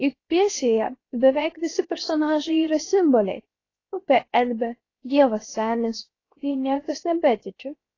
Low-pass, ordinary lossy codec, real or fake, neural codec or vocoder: 7.2 kHz; AAC, 32 kbps; fake; codec, 16 kHz, 0.7 kbps, FocalCodec